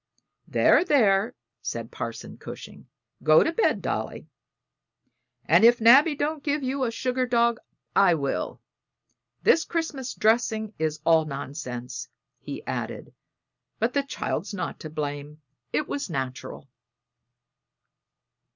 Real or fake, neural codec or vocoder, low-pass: real; none; 7.2 kHz